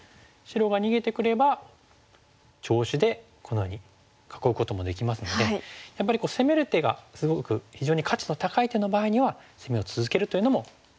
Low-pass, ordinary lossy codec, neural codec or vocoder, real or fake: none; none; none; real